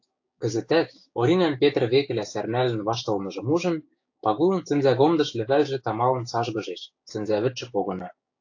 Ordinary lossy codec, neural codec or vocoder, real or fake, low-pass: AAC, 48 kbps; codec, 16 kHz, 6 kbps, DAC; fake; 7.2 kHz